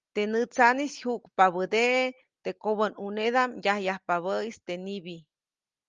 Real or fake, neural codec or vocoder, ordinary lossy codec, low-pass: real; none; Opus, 32 kbps; 7.2 kHz